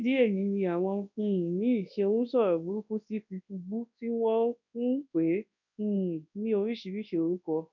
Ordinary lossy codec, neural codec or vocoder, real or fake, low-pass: none; codec, 24 kHz, 0.9 kbps, WavTokenizer, large speech release; fake; 7.2 kHz